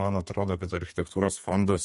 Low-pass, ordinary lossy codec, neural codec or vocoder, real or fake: 14.4 kHz; MP3, 48 kbps; codec, 32 kHz, 1.9 kbps, SNAC; fake